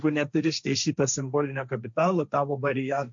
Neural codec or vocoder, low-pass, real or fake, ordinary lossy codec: codec, 16 kHz, 1.1 kbps, Voila-Tokenizer; 7.2 kHz; fake; MP3, 64 kbps